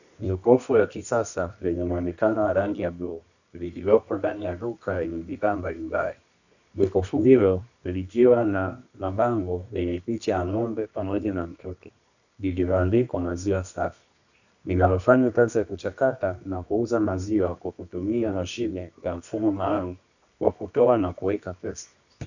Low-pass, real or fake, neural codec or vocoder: 7.2 kHz; fake; codec, 24 kHz, 0.9 kbps, WavTokenizer, medium music audio release